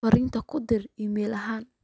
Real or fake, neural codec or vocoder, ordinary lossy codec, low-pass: real; none; none; none